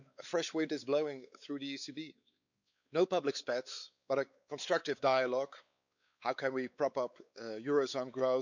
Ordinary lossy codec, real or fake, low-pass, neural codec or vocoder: none; fake; 7.2 kHz; codec, 16 kHz, 4 kbps, X-Codec, WavLM features, trained on Multilingual LibriSpeech